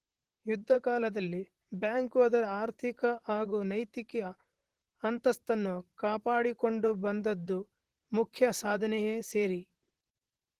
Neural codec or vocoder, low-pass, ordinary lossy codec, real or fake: vocoder, 44.1 kHz, 128 mel bands, Pupu-Vocoder; 14.4 kHz; Opus, 16 kbps; fake